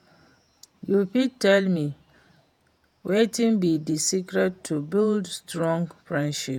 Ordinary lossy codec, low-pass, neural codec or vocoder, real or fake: none; 19.8 kHz; vocoder, 48 kHz, 128 mel bands, Vocos; fake